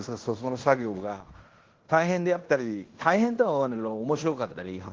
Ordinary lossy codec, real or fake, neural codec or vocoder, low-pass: Opus, 16 kbps; fake; codec, 16 kHz in and 24 kHz out, 0.9 kbps, LongCat-Audio-Codec, fine tuned four codebook decoder; 7.2 kHz